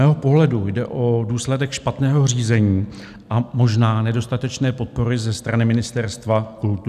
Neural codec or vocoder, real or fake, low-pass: none; real; 14.4 kHz